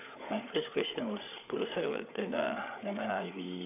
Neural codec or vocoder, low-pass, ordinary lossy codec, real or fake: codec, 16 kHz, 8 kbps, FreqCodec, larger model; 3.6 kHz; none; fake